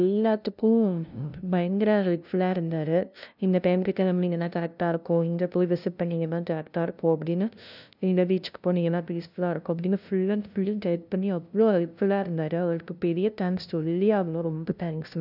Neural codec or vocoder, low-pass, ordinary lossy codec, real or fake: codec, 16 kHz, 0.5 kbps, FunCodec, trained on LibriTTS, 25 frames a second; 5.4 kHz; none; fake